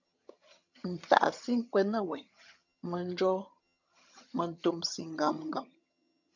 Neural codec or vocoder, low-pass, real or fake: vocoder, 22.05 kHz, 80 mel bands, HiFi-GAN; 7.2 kHz; fake